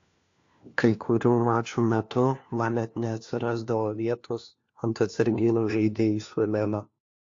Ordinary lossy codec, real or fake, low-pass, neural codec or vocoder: AAC, 48 kbps; fake; 7.2 kHz; codec, 16 kHz, 1 kbps, FunCodec, trained on LibriTTS, 50 frames a second